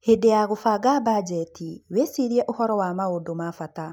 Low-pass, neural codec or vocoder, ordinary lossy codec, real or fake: none; none; none; real